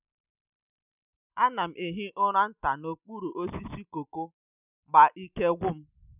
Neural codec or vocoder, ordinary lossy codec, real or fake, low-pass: none; none; real; 3.6 kHz